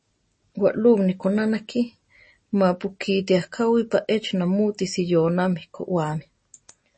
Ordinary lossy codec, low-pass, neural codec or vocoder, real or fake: MP3, 32 kbps; 9.9 kHz; none; real